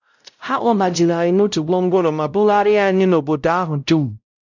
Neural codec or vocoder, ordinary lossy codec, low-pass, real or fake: codec, 16 kHz, 0.5 kbps, X-Codec, WavLM features, trained on Multilingual LibriSpeech; none; 7.2 kHz; fake